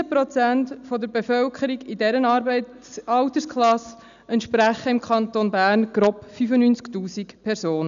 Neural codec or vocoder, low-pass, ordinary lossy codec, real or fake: none; 7.2 kHz; none; real